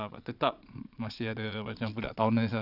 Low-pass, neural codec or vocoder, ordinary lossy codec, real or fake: 5.4 kHz; vocoder, 22.05 kHz, 80 mel bands, Vocos; none; fake